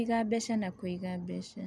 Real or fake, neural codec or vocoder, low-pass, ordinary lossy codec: real; none; none; none